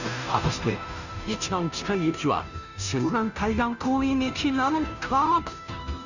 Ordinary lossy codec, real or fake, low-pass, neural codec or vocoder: none; fake; 7.2 kHz; codec, 16 kHz, 0.5 kbps, FunCodec, trained on Chinese and English, 25 frames a second